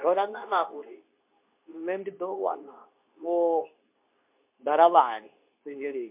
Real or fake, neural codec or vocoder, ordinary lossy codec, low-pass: fake; codec, 24 kHz, 0.9 kbps, WavTokenizer, medium speech release version 2; MP3, 32 kbps; 3.6 kHz